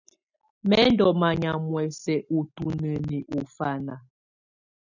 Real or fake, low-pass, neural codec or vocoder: real; 7.2 kHz; none